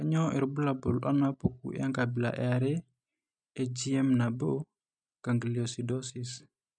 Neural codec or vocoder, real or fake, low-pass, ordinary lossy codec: none; real; 9.9 kHz; none